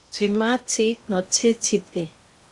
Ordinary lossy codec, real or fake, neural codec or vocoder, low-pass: Opus, 64 kbps; fake; codec, 16 kHz in and 24 kHz out, 0.8 kbps, FocalCodec, streaming, 65536 codes; 10.8 kHz